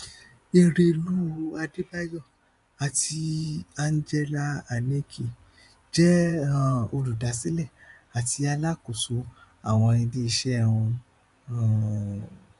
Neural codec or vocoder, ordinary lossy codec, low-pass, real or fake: vocoder, 24 kHz, 100 mel bands, Vocos; MP3, 96 kbps; 10.8 kHz; fake